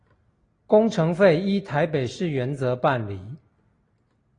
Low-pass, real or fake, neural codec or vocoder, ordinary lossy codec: 9.9 kHz; real; none; AAC, 32 kbps